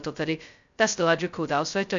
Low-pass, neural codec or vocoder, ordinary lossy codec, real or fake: 7.2 kHz; codec, 16 kHz, 0.2 kbps, FocalCodec; MP3, 64 kbps; fake